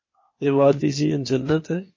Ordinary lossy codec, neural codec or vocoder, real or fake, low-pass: MP3, 32 kbps; codec, 16 kHz, 0.8 kbps, ZipCodec; fake; 7.2 kHz